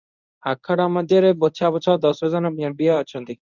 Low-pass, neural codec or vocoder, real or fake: 7.2 kHz; codec, 24 kHz, 0.9 kbps, WavTokenizer, medium speech release version 1; fake